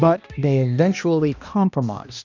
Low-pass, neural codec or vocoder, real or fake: 7.2 kHz; codec, 16 kHz, 1 kbps, X-Codec, HuBERT features, trained on balanced general audio; fake